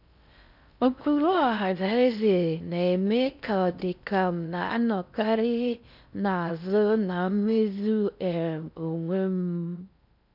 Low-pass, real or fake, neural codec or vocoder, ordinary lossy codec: 5.4 kHz; fake; codec, 16 kHz in and 24 kHz out, 0.6 kbps, FocalCodec, streaming, 4096 codes; none